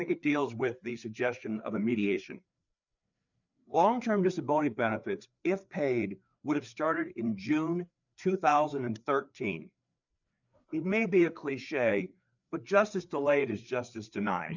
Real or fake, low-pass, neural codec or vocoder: fake; 7.2 kHz; codec, 16 kHz, 4 kbps, FreqCodec, larger model